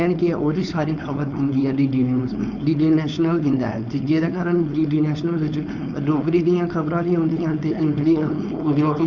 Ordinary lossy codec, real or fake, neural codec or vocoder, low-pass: none; fake; codec, 16 kHz, 4.8 kbps, FACodec; 7.2 kHz